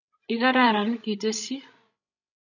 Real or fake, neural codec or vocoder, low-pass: fake; codec, 16 kHz, 16 kbps, FreqCodec, larger model; 7.2 kHz